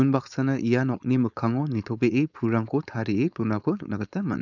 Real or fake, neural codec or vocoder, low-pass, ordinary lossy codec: fake; codec, 16 kHz, 4.8 kbps, FACodec; 7.2 kHz; none